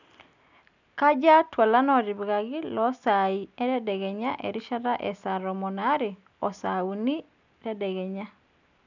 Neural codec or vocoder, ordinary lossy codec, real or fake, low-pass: none; none; real; 7.2 kHz